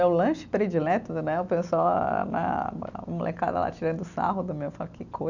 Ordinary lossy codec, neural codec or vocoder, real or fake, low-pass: none; none; real; 7.2 kHz